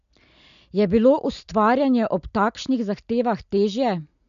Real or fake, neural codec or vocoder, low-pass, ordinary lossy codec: real; none; 7.2 kHz; none